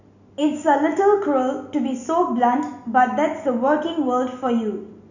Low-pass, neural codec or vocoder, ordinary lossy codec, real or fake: 7.2 kHz; none; none; real